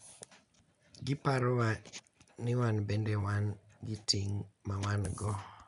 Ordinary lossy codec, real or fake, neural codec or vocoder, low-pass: none; real; none; 10.8 kHz